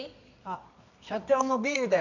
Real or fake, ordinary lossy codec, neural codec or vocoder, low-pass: fake; none; codec, 24 kHz, 0.9 kbps, WavTokenizer, medium music audio release; 7.2 kHz